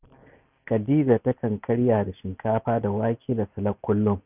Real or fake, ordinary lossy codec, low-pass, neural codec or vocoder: real; none; 3.6 kHz; none